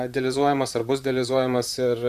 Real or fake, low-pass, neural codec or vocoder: fake; 14.4 kHz; vocoder, 44.1 kHz, 128 mel bands, Pupu-Vocoder